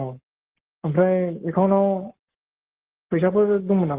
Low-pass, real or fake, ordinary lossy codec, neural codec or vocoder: 3.6 kHz; fake; Opus, 16 kbps; codec, 44.1 kHz, 7.8 kbps, Pupu-Codec